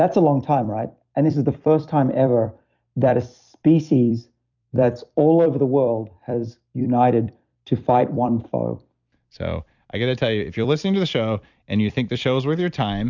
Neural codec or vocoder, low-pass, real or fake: vocoder, 44.1 kHz, 128 mel bands every 256 samples, BigVGAN v2; 7.2 kHz; fake